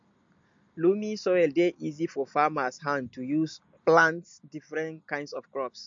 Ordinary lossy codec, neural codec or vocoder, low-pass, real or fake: MP3, 48 kbps; none; 7.2 kHz; real